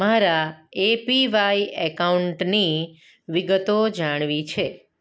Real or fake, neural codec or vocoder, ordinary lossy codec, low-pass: real; none; none; none